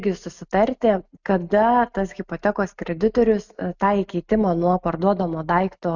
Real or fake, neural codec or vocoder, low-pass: real; none; 7.2 kHz